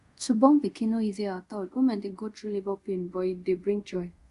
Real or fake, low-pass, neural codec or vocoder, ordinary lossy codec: fake; 10.8 kHz; codec, 24 kHz, 0.5 kbps, DualCodec; Opus, 64 kbps